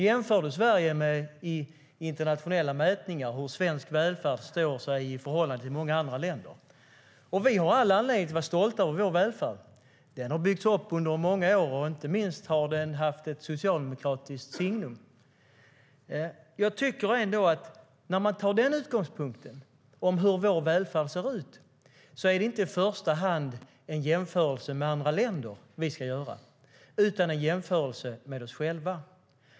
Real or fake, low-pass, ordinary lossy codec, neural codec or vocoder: real; none; none; none